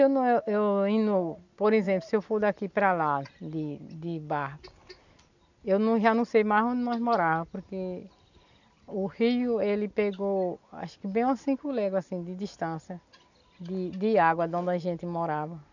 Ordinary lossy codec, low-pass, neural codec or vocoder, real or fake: none; 7.2 kHz; none; real